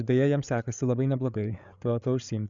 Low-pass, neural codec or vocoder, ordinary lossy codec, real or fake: 7.2 kHz; codec, 16 kHz, 8 kbps, FreqCodec, larger model; Opus, 64 kbps; fake